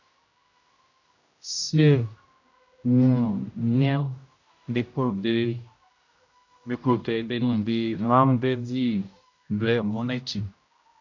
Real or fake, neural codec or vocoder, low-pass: fake; codec, 16 kHz, 0.5 kbps, X-Codec, HuBERT features, trained on general audio; 7.2 kHz